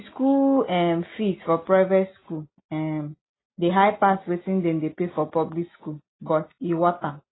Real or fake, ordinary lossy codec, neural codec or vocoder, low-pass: real; AAC, 16 kbps; none; 7.2 kHz